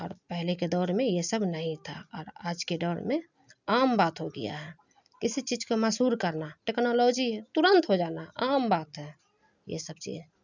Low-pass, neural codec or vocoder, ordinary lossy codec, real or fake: 7.2 kHz; none; none; real